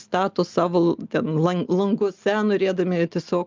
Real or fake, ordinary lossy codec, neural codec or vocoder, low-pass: real; Opus, 24 kbps; none; 7.2 kHz